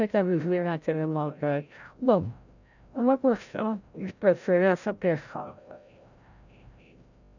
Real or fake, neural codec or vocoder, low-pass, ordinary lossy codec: fake; codec, 16 kHz, 0.5 kbps, FreqCodec, larger model; 7.2 kHz; none